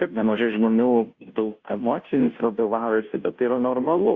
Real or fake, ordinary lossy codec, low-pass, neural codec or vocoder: fake; Opus, 64 kbps; 7.2 kHz; codec, 16 kHz, 0.5 kbps, FunCodec, trained on Chinese and English, 25 frames a second